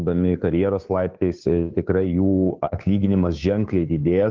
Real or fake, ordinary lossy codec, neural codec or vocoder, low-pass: fake; Opus, 24 kbps; codec, 16 kHz, 2 kbps, FunCodec, trained on Chinese and English, 25 frames a second; 7.2 kHz